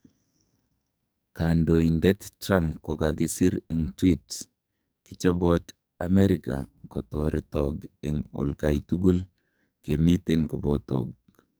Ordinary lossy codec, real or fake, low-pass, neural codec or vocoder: none; fake; none; codec, 44.1 kHz, 2.6 kbps, SNAC